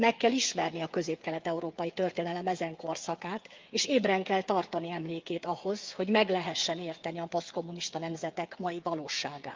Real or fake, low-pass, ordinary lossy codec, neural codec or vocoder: fake; 7.2 kHz; Opus, 24 kbps; codec, 16 kHz, 8 kbps, FreqCodec, smaller model